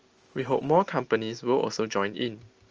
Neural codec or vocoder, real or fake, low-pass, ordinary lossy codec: none; real; 7.2 kHz; Opus, 24 kbps